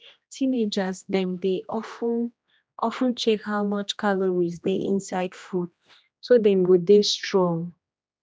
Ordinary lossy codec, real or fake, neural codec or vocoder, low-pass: none; fake; codec, 16 kHz, 1 kbps, X-Codec, HuBERT features, trained on general audio; none